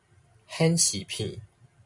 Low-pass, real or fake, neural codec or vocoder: 10.8 kHz; real; none